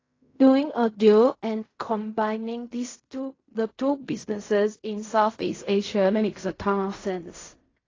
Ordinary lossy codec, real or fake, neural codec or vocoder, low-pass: AAC, 32 kbps; fake; codec, 16 kHz in and 24 kHz out, 0.4 kbps, LongCat-Audio-Codec, fine tuned four codebook decoder; 7.2 kHz